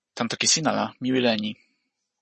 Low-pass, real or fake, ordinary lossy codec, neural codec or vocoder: 10.8 kHz; real; MP3, 32 kbps; none